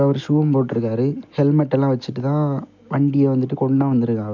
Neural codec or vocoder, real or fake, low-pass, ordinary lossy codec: none; real; 7.2 kHz; none